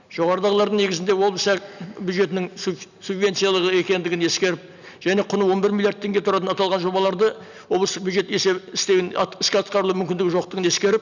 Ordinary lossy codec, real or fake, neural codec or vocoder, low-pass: none; real; none; 7.2 kHz